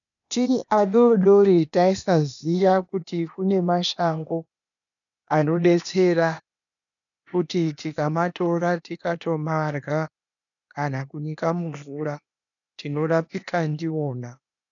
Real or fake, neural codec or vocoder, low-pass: fake; codec, 16 kHz, 0.8 kbps, ZipCodec; 7.2 kHz